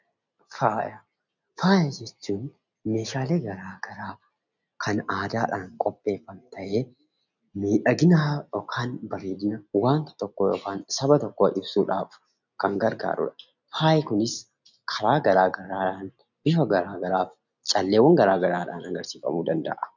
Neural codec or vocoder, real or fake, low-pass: none; real; 7.2 kHz